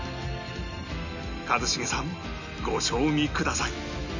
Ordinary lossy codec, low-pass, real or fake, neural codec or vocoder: none; 7.2 kHz; real; none